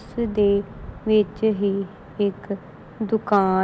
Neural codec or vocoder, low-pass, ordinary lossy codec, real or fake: none; none; none; real